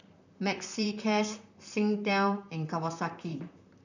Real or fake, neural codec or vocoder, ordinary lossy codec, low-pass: fake; vocoder, 44.1 kHz, 128 mel bands, Pupu-Vocoder; none; 7.2 kHz